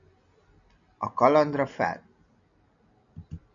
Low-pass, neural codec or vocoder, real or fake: 7.2 kHz; none; real